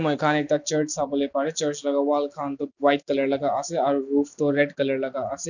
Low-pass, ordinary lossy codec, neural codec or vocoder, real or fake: 7.2 kHz; none; none; real